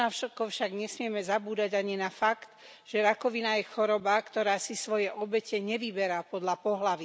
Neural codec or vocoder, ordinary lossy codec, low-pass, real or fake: none; none; none; real